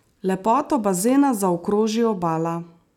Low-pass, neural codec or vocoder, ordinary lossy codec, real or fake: 19.8 kHz; none; none; real